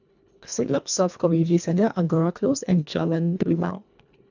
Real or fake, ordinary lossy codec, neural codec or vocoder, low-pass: fake; none; codec, 24 kHz, 1.5 kbps, HILCodec; 7.2 kHz